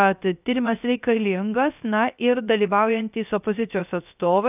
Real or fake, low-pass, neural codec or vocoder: fake; 3.6 kHz; codec, 16 kHz, 0.3 kbps, FocalCodec